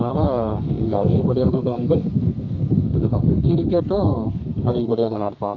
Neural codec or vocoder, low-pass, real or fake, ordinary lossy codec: codec, 32 kHz, 1.9 kbps, SNAC; 7.2 kHz; fake; AAC, 48 kbps